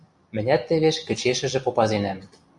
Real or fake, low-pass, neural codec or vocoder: real; 10.8 kHz; none